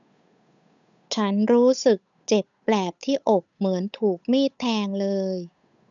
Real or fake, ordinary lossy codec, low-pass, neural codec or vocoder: fake; none; 7.2 kHz; codec, 16 kHz, 8 kbps, FunCodec, trained on Chinese and English, 25 frames a second